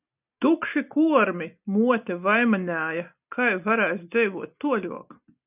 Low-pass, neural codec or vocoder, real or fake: 3.6 kHz; none; real